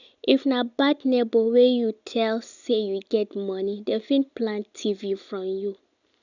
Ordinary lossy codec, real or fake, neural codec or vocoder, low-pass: none; real; none; 7.2 kHz